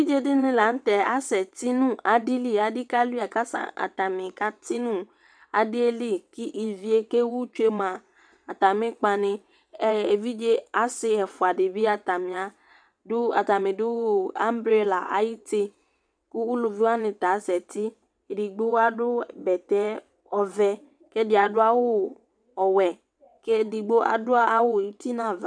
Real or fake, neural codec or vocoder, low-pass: fake; vocoder, 22.05 kHz, 80 mel bands, WaveNeXt; 9.9 kHz